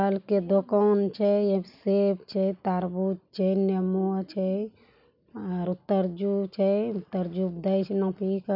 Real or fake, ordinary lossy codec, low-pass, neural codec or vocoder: real; none; 5.4 kHz; none